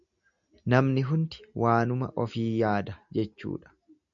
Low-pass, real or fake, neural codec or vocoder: 7.2 kHz; real; none